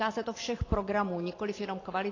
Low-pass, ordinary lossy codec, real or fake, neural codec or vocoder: 7.2 kHz; AAC, 32 kbps; fake; vocoder, 44.1 kHz, 128 mel bands every 512 samples, BigVGAN v2